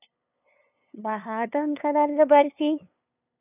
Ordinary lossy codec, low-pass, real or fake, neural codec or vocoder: none; 3.6 kHz; fake; codec, 16 kHz, 2 kbps, FunCodec, trained on LibriTTS, 25 frames a second